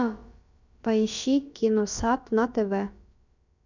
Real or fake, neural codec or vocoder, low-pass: fake; codec, 16 kHz, about 1 kbps, DyCAST, with the encoder's durations; 7.2 kHz